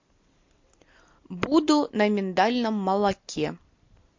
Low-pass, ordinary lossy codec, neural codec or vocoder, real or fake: 7.2 kHz; MP3, 48 kbps; none; real